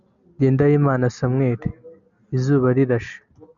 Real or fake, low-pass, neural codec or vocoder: real; 7.2 kHz; none